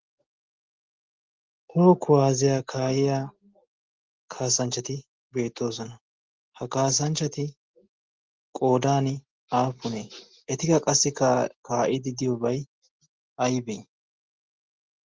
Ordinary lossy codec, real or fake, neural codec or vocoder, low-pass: Opus, 16 kbps; real; none; 7.2 kHz